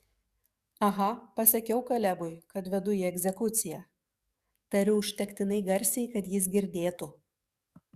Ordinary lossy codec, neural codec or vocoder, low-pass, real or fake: Opus, 64 kbps; codec, 44.1 kHz, 7.8 kbps, DAC; 14.4 kHz; fake